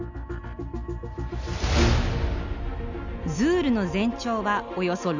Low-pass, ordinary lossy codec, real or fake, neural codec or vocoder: 7.2 kHz; none; real; none